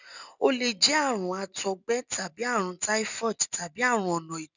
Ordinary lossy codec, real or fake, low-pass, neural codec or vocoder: none; real; 7.2 kHz; none